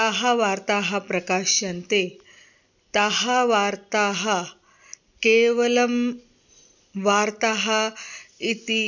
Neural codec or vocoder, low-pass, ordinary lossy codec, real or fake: none; 7.2 kHz; none; real